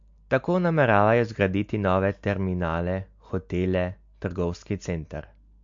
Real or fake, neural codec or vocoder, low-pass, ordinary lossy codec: real; none; 7.2 kHz; MP3, 48 kbps